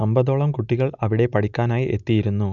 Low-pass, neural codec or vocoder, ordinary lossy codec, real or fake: 7.2 kHz; none; none; real